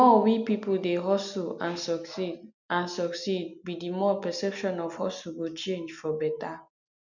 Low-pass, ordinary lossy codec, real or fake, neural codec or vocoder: 7.2 kHz; none; real; none